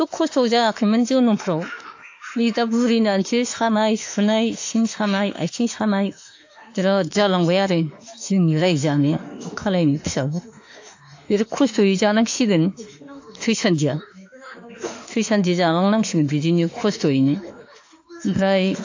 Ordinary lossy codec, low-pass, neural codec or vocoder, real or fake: AAC, 48 kbps; 7.2 kHz; autoencoder, 48 kHz, 32 numbers a frame, DAC-VAE, trained on Japanese speech; fake